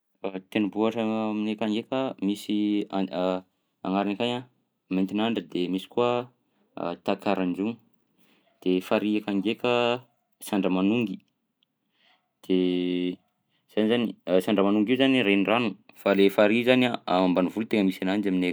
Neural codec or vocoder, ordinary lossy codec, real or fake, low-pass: none; none; real; none